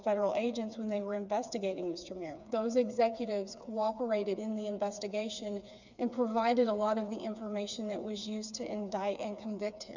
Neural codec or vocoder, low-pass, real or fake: codec, 16 kHz, 4 kbps, FreqCodec, smaller model; 7.2 kHz; fake